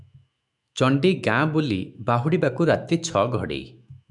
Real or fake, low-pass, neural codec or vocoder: fake; 10.8 kHz; autoencoder, 48 kHz, 128 numbers a frame, DAC-VAE, trained on Japanese speech